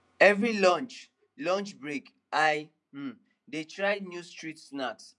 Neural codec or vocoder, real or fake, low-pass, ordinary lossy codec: vocoder, 48 kHz, 128 mel bands, Vocos; fake; 10.8 kHz; none